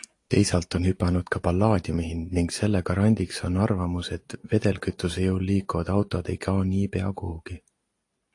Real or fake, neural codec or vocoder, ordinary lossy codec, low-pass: real; none; AAC, 48 kbps; 10.8 kHz